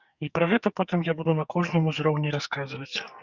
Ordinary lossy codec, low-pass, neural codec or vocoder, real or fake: Opus, 64 kbps; 7.2 kHz; codec, 44.1 kHz, 2.6 kbps, SNAC; fake